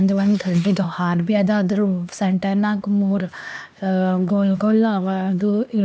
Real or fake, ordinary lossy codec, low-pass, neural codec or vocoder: fake; none; none; codec, 16 kHz, 2 kbps, X-Codec, HuBERT features, trained on LibriSpeech